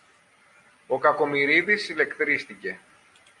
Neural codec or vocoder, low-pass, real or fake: none; 10.8 kHz; real